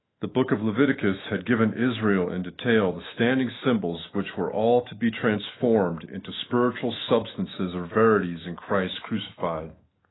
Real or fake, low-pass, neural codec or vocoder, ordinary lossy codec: fake; 7.2 kHz; autoencoder, 48 kHz, 128 numbers a frame, DAC-VAE, trained on Japanese speech; AAC, 16 kbps